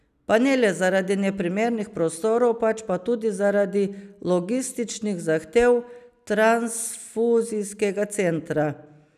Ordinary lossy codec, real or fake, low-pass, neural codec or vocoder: none; real; 14.4 kHz; none